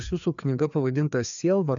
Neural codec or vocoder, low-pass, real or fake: codec, 16 kHz, 2 kbps, FreqCodec, larger model; 7.2 kHz; fake